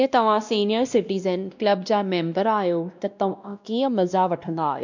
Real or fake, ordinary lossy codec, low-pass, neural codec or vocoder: fake; none; 7.2 kHz; codec, 16 kHz, 1 kbps, X-Codec, WavLM features, trained on Multilingual LibriSpeech